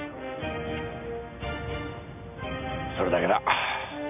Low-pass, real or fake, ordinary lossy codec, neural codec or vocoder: 3.6 kHz; real; none; none